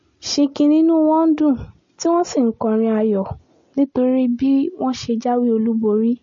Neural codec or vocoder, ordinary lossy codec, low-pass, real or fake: none; MP3, 32 kbps; 7.2 kHz; real